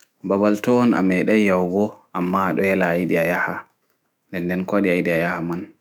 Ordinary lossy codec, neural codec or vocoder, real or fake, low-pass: none; autoencoder, 48 kHz, 128 numbers a frame, DAC-VAE, trained on Japanese speech; fake; 19.8 kHz